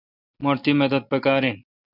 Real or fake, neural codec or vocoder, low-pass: real; none; 5.4 kHz